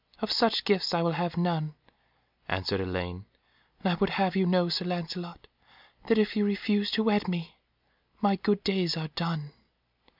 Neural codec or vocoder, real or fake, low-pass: none; real; 5.4 kHz